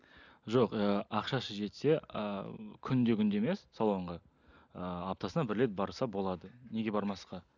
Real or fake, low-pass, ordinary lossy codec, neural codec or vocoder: real; 7.2 kHz; none; none